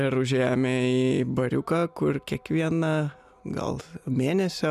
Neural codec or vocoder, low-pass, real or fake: none; 14.4 kHz; real